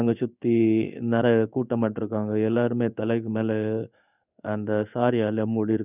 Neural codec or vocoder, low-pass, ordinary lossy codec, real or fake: codec, 16 kHz in and 24 kHz out, 1 kbps, XY-Tokenizer; 3.6 kHz; none; fake